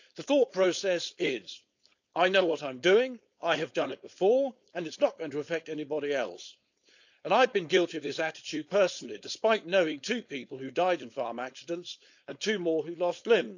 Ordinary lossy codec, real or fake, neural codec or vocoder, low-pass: none; fake; codec, 16 kHz, 4.8 kbps, FACodec; 7.2 kHz